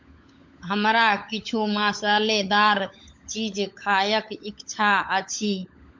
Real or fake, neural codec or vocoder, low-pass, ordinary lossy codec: fake; codec, 16 kHz, 16 kbps, FunCodec, trained on LibriTTS, 50 frames a second; 7.2 kHz; MP3, 48 kbps